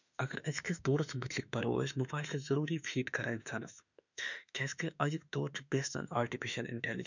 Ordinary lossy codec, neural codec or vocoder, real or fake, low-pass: none; autoencoder, 48 kHz, 32 numbers a frame, DAC-VAE, trained on Japanese speech; fake; 7.2 kHz